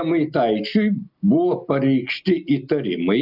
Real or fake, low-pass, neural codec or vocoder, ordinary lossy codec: real; 5.4 kHz; none; AAC, 48 kbps